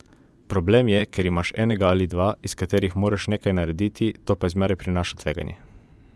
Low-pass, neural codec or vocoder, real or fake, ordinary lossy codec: none; vocoder, 24 kHz, 100 mel bands, Vocos; fake; none